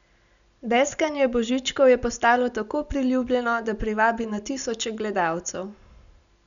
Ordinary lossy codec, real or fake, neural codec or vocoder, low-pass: none; real; none; 7.2 kHz